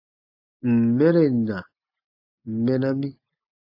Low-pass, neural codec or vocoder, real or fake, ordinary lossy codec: 5.4 kHz; none; real; AAC, 32 kbps